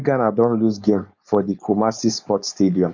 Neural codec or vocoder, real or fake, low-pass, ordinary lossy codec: codec, 16 kHz, 4.8 kbps, FACodec; fake; 7.2 kHz; none